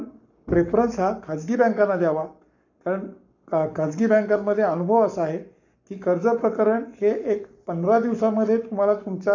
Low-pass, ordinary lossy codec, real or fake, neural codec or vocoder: 7.2 kHz; none; fake; codec, 44.1 kHz, 7.8 kbps, Pupu-Codec